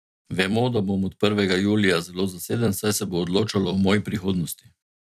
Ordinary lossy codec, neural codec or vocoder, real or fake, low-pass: none; vocoder, 44.1 kHz, 128 mel bands every 256 samples, BigVGAN v2; fake; 14.4 kHz